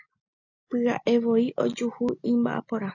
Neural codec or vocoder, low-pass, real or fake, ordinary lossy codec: none; 7.2 kHz; real; AAC, 48 kbps